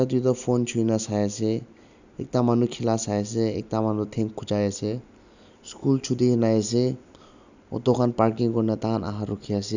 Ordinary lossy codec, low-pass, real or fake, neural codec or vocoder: none; 7.2 kHz; real; none